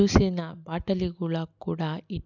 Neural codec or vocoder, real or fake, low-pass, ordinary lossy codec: none; real; 7.2 kHz; none